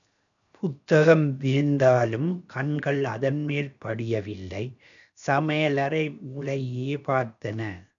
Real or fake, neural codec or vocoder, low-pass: fake; codec, 16 kHz, 0.7 kbps, FocalCodec; 7.2 kHz